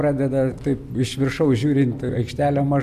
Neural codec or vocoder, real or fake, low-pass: none; real; 14.4 kHz